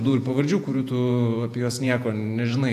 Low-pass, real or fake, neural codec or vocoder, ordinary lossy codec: 14.4 kHz; fake; vocoder, 48 kHz, 128 mel bands, Vocos; AAC, 64 kbps